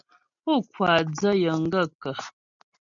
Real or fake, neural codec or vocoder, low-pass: real; none; 7.2 kHz